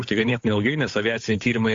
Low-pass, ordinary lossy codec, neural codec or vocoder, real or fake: 7.2 kHz; AAC, 48 kbps; codec, 16 kHz, 8 kbps, FunCodec, trained on Chinese and English, 25 frames a second; fake